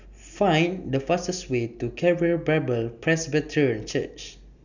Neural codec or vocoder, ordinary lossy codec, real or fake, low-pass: none; none; real; 7.2 kHz